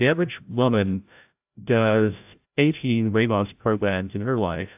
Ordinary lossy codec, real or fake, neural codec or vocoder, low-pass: AAC, 32 kbps; fake; codec, 16 kHz, 0.5 kbps, FreqCodec, larger model; 3.6 kHz